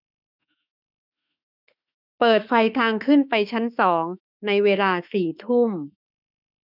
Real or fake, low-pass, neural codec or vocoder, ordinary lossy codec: fake; 5.4 kHz; autoencoder, 48 kHz, 32 numbers a frame, DAC-VAE, trained on Japanese speech; none